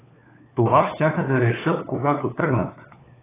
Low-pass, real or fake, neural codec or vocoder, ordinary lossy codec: 3.6 kHz; fake; codec, 16 kHz, 4 kbps, FunCodec, trained on LibriTTS, 50 frames a second; AAC, 16 kbps